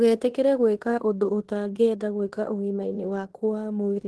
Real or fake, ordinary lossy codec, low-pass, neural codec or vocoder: fake; Opus, 16 kbps; 10.8 kHz; codec, 16 kHz in and 24 kHz out, 0.9 kbps, LongCat-Audio-Codec, fine tuned four codebook decoder